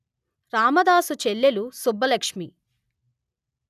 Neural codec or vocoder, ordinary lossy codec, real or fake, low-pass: none; none; real; 14.4 kHz